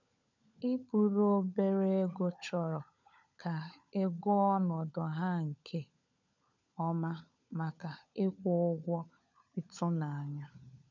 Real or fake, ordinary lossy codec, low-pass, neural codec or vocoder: fake; none; 7.2 kHz; codec, 16 kHz, 16 kbps, FunCodec, trained on LibriTTS, 50 frames a second